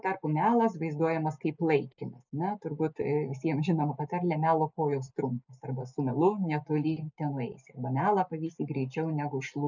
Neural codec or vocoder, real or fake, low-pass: none; real; 7.2 kHz